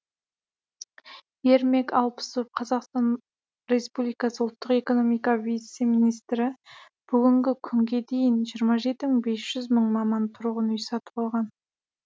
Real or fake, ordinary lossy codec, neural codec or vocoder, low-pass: real; none; none; none